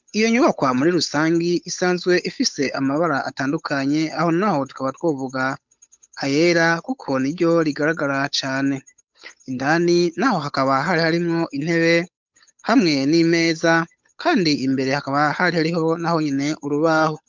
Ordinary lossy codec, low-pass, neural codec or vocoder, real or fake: MP3, 64 kbps; 7.2 kHz; codec, 16 kHz, 8 kbps, FunCodec, trained on Chinese and English, 25 frames a second; fake